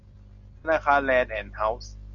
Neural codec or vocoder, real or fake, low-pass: none; real; 7.2 kHz